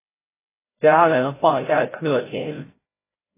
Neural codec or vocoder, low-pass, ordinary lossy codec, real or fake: codec, 16 kHz, 0.5 kbps, FreqCodec, larger model; 3.6 kHz; AAC, 16 kbps; fake